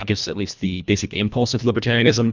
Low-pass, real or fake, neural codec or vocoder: 7.2 kHz; fake; codec, 24 kHz, 1.5 kbps, HILCodec